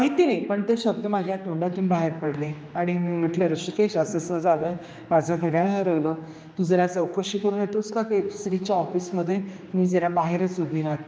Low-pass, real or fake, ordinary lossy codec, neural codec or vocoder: none; fake; none; codec, 16 kHz, 2 kbps, X-Codec, HuBERT features, trained on general audio